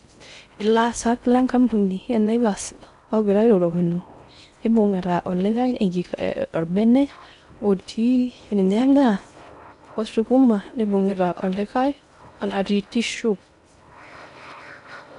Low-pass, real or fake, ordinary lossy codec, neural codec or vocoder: 10.8 kHz; fake; none; codec, 16 kHz in and 24 kHz out, 0.6 kbps, FocalCodec, streaming, 4096 codes